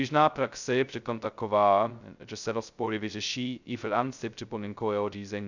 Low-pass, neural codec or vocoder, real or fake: 7.2 kHz; codec, 16 kHz, 0.2 kbps, FocalCodec; fake